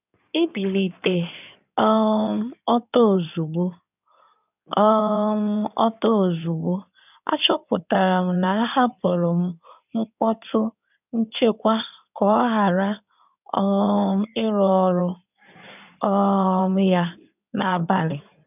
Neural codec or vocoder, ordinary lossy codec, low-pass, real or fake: codec, 16 kHz in and 24 kHz out, 2.2 kbps, FireRedTTS-2 codec; none; 3.6 kHz; fake